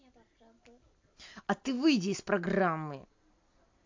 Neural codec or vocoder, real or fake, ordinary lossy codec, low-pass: none; real; MP3, 64 kbps; 7.2 kHz